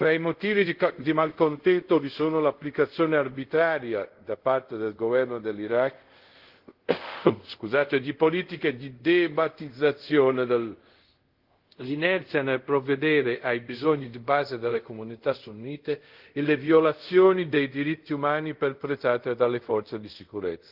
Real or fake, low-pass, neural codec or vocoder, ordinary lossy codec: fake; 5.4 kHz; codec, 24 kHz, 0.5 kbps, DualCodec; Opus, 16 kbps